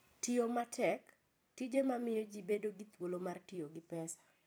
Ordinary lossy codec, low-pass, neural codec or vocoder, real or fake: none; none; vocoder, 44.1 kHz, 128 mel bands every 256 samples, BigVGAN v2; fake